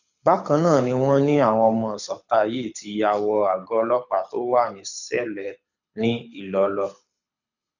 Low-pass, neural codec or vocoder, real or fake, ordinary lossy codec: 7.2 kHz; codec, 24 kHz, 6 kbps, HILCodec; fake; none